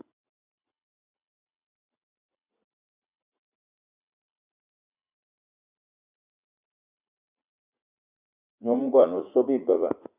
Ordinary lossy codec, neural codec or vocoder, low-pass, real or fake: Opus, 64 kbps; vocoder, 44.1 kHz, 80 mel bands, Vocos; 3.6 kHz; fake